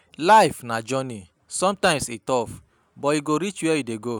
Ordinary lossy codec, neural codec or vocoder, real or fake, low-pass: none; none; real; none